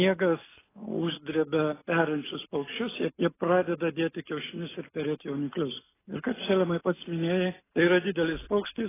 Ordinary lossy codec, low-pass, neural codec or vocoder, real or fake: AAC, 16 kbps; 3.6 kHz; none; real